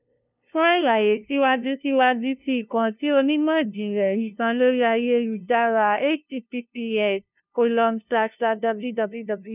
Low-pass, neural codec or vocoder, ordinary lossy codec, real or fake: 3.6 kHz; codec, 16 kHz, 0.5 kbps, FunCodec, trained on LibriTTS, 25 frames a second; none; fake